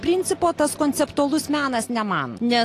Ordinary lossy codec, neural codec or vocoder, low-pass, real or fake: AAC, 48 kbps; none; 14.4 kHz; real